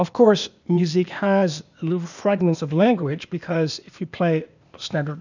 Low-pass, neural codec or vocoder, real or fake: 7.2 kHz; codec, 16 kHz, 0.8 kbps, ZipCodec; fake